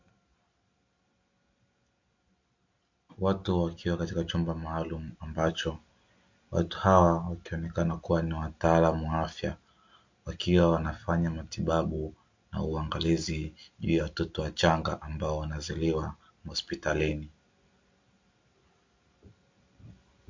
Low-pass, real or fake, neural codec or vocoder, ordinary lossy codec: 7.2 kHz; real; none; MP3, 48 kbps